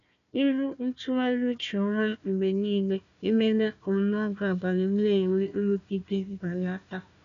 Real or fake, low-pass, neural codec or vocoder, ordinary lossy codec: fake; 7.2 kHz; codec, 16 kHz, 1 kbps, FunCodec, trained on Chinese and English, 50 frames a second; MP3, 96 kbps